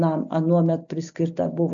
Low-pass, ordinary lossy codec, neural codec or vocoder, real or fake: 7.2 kHz; AAC, 64 kbps; none; real